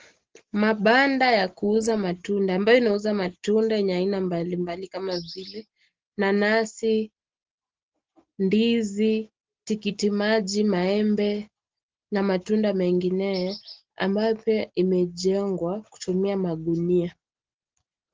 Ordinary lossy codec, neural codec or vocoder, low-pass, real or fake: Opus, 16 kbps; none; 7.2 kHz; real